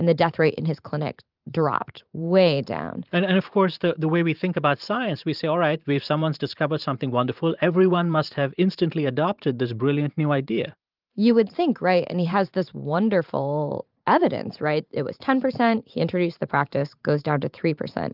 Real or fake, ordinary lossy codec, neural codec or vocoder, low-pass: fake; Opus, 24 kbps; vocoder, 44.1 kHz, 128 mel bands every 512 samples, BigVGAN v2; 5.4 kHz